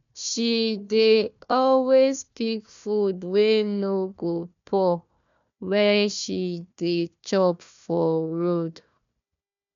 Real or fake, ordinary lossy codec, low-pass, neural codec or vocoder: fake; MP3, 64 kbps; 7.2 kHz; codec, 16 kHz, 1 kbps, FunCodec, trained on Chinese and English, 50 frames a second